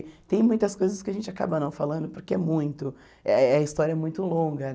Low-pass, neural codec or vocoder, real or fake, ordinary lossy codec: none; none; real; none